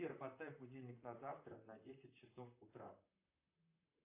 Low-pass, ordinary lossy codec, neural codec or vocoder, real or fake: 3.6 kHz; AAC, 32 kbps; vocoder, 44.1 kHz, 128 mel bands, Pupu-Vocoder; fake